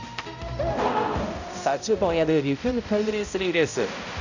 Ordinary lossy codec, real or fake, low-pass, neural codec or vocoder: none; fake; 7.2 kHz; codec, 16 kHz, 0.5 kbps, X-Codec, HuBERT features, trained on balanced general audio